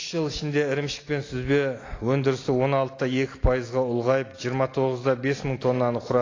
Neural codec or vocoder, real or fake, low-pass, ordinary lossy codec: none; real; 7.2 kHz; AAC, 32 kbps